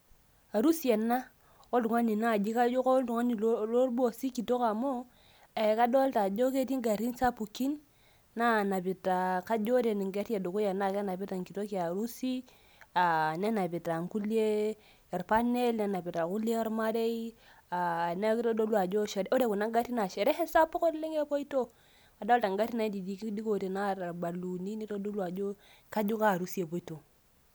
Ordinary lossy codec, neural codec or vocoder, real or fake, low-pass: none; none; real; none